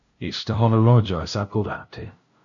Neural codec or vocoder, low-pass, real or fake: codec, 16 kHz, 0.5 kbps, FunCodec, trained on LibriTTS, 25 frames a second; 7.2 kHz; fake